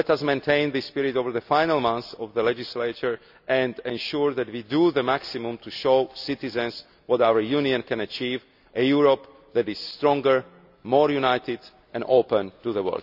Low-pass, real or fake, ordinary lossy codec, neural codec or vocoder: 5.4 kHz; real; none; none